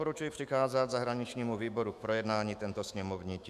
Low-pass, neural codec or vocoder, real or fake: 14.4 kHz; autoencoder, 48 kHz, 128 numbers a frame, DAC-VAE, trained on Japanese speech; fake